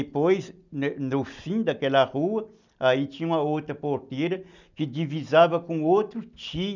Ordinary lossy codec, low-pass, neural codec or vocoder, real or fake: none; 7.2 kHz; none; real